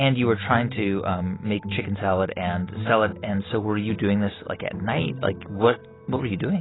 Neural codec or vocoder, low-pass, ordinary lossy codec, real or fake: none; 7.2 kHz; AAC, 16 kbps; real